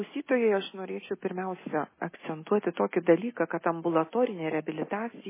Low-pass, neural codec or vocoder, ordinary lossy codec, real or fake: 3.6 kHz; none; MP3, 16 kbps; real